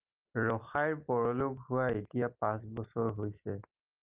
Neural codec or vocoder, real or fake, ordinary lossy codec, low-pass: none; real; Opus, 24 kbps; 3.6 kHz